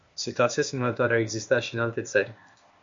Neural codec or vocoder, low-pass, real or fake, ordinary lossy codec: codec, 16 kHz, 0.8 kbps, ZipCodec; 7.2 kHz; fake; MP3, 48 kbps